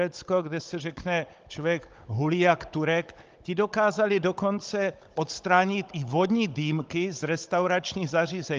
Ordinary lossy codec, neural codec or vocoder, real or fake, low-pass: Opus, 32 kbps; codec, 16 kHz, 16 kbps, FunCodec, trained on Chinese and English, 50 frames a second; fake; 7.2 kHz